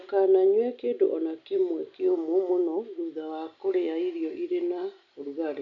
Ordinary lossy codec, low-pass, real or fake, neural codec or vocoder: AAC, 64 kbps; 7.2 kHz; real; none